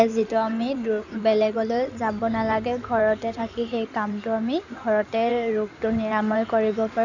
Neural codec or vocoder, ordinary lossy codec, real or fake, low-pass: codec, 16 kHz in and 24 kHz out, 2.2 kbps, FireRedTTS-2 codec; none; fake; 7.2 kHz